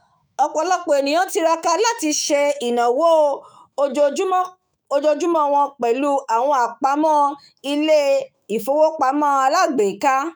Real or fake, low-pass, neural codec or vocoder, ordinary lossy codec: fake; none; autoencoder, 48 kHz, 128 numbers a frame, DAC-VAE, trained on Japanese speech; none